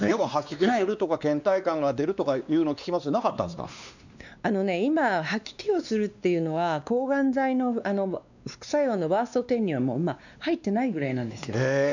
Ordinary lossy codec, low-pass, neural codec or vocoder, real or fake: none; 7.2 kHz; codec, 16 kHz, 2 kbps, X-Codec, WavLM features, trained on Multilingual LibriSpeech; fake